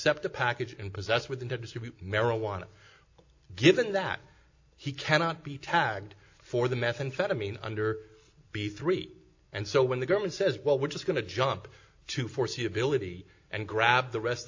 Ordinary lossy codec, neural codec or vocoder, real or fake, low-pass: AAC, 48 kbps; none; real; 7.2 kHz